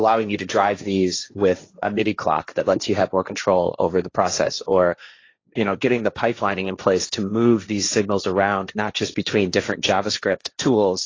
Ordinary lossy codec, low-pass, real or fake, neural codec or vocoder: AAC, 32 kbps; 7.2 kHz; fake; codec, 16 kHz, 1.1 kbps, Voila-Tokenizer